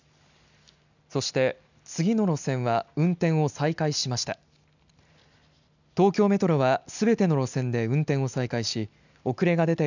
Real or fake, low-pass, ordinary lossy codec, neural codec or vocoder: real; 7.2 kHz; none; none